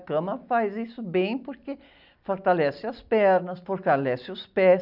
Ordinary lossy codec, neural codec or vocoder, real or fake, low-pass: none; none; real; 5.4 kHz